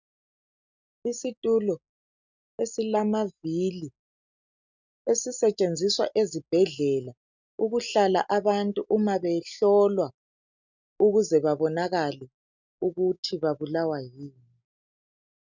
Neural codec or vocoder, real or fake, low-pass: none; real; 7.2 kHz